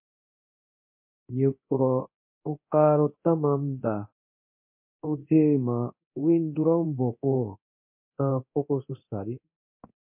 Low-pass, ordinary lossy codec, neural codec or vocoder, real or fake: 3.6 kHz; MP3, 32 kbps; codec, 24 kHz, 0.9 kbps, DualCodec; fake